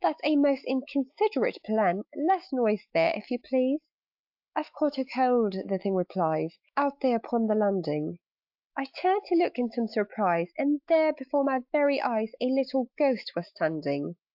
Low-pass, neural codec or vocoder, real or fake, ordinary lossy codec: 5.4 kHz; autoencoder, 48 kHz, 128 numbers a frame, DAC-VAE, trained on Japanese speech; fake; AAC, 48 kbps